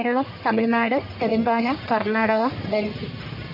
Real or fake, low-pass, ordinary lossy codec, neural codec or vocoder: fake; 5.4 kHz; MP3, 32 kbps; codec, 44.1 kHz, 1.7 kbps, Pupu-Codec